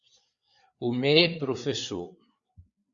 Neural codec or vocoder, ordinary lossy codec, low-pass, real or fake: codec, 16 kHz, 4 kbps, FreqCodec, larger model; Opus, 64 kbps; 7.2 kHz; fake